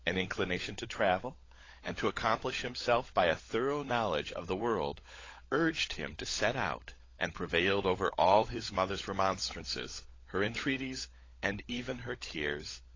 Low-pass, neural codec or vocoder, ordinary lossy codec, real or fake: 7.2 kHz; codec, 16 kHz, 16 kbps, FunCodec, trained on LibriTTS, 50 frames a second; AAC, 32 kbps; fake